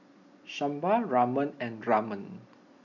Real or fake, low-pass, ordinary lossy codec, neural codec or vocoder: real; 7.2 kHz; none; none